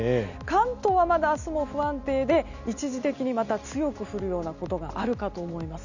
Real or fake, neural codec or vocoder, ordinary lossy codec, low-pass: real; none; none; 7.2 kHz